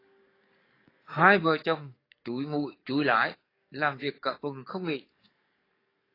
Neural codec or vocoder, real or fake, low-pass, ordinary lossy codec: codec, 44.1 kHz, 7.8 kbps, DAC; fake; 5.4 kHz; AAC, 24 kbps